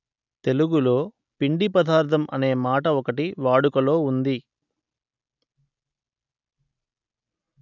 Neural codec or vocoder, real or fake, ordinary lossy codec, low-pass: none; real; none; 7.2 kHz